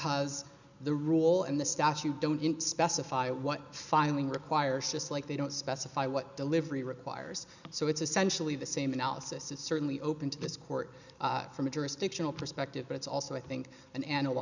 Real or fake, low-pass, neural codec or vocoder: real; 7.2 kHz; none